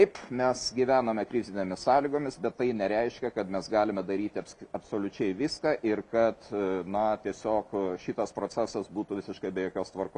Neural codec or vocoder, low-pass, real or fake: none; 10.8 kHz; real